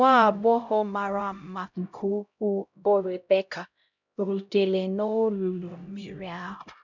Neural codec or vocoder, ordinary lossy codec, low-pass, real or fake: codec, 16 kHz, 0.5 kbps, X-Codec, HuBERT features, trained on LibriSpeech; none; 7.2 kHz; fake